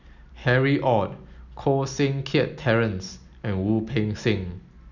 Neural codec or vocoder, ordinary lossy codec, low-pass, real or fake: none; none; 7.2 kHz; real